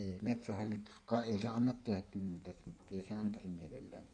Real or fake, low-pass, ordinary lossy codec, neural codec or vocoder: fake; 9.9 kHz; none; codec, 16 kHz in and 24 kHz out, 1.1 kbps, FireRedTTS-2 codec